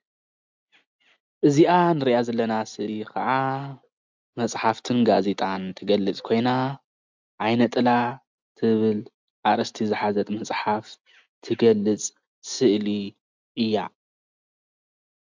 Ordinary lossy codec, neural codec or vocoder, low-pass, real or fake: MP3, 64 kbps; none; 7.2 kHz; real